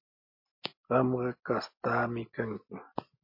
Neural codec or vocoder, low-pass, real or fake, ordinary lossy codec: none; 5.4 kHz; real; MP3, 24 kbps